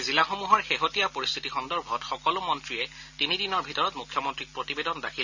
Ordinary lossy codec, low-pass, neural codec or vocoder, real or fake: none; 7.2 kHz; none; real